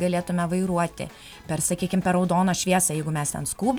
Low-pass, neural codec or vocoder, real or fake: 19.8 kHz; none; real